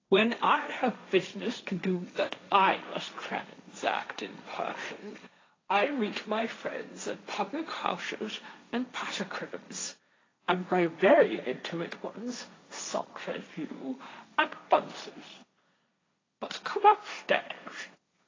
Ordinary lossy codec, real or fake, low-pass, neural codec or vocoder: AAC, 32 kbps; fake; 7.2 kHz; codec, 16 kHz, 1.1 kbps, Voila-Tokenizer